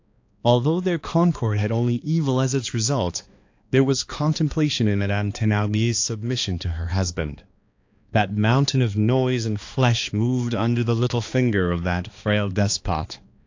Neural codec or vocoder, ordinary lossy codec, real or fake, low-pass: codec, 16 kHz, 2 kbps, X-Codec, HuBERT features, trained on balanced general audio; AAC, 48 kbps; fake; 7.2 kHz